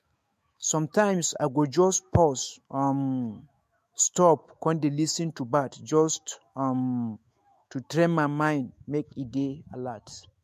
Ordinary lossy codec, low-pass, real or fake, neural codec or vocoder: MP3, 64 kbps; 14.4 kHz; fake; autoencoder, 48 kHz, 128 numbers a frame, DAC-VAE, trained on Japanese speech